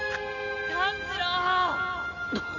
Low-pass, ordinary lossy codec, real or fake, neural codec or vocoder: 7.2 kHz; none; real; none